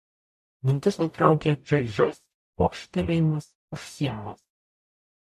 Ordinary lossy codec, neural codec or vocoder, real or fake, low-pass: MP3, 64 kbps; codec, 44.1 kHz, 0.9 kbps, DAC; fake; 14.4 kHz